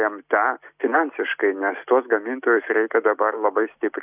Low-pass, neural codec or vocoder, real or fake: 3.6 kHz; none; real